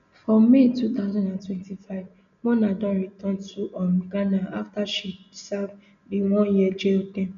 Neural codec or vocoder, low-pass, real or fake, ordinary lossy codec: none; 7.2 kHz; real; none